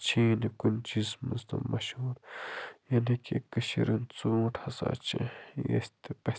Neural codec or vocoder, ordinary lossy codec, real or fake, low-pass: none; none; real; none